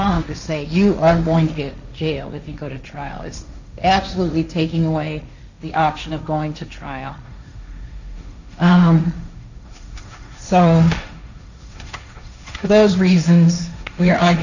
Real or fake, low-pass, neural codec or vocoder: fake; 7.2 kHz; codec, 16 kHz, 1.1 kbps, Voila-Tokenizer